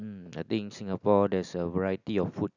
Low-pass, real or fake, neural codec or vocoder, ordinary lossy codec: 7.2 kHz; real; none; none